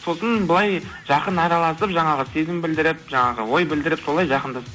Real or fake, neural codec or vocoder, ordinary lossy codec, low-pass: real; none; none; none